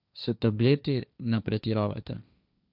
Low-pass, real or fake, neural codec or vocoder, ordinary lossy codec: 5.4 kHz; fake; codec, 16 kHz, 1.1 kbps, Voila-Tokenizer; none